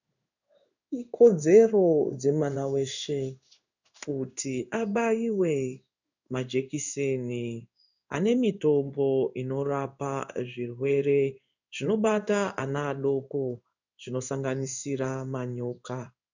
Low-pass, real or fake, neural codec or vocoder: 7.2 kHz; fake; codec, 16 kHz in and 24 kHz out, 1 kbps, XY-Tokenizer